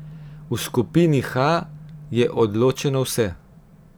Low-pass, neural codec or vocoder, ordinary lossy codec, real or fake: none; vocoder, 44.1 kHz, 128 mel bands every 512 samples, BigVGAN v2; none; fake